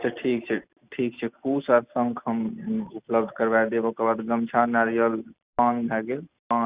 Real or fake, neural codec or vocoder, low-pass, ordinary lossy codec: real; none; 3.6 kHz; Opus, 64 kbps